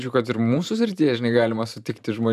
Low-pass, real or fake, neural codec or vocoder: 14.4 kHz; fake; vocoder, 44.1 kHz, 128 mel bands every 512 samples, BigVGAN v2